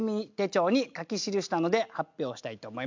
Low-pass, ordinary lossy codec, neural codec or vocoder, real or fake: 7.2 kHz; MP3, 64 kbps; none; real